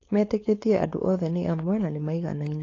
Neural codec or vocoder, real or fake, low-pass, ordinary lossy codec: codec, 16 kHz, 4.8 kbps, FACodec; fake; 7.2 kHz; AAC, 32 kbps